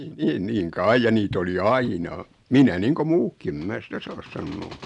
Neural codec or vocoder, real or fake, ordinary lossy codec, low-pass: none; real; none; 10.8 kHz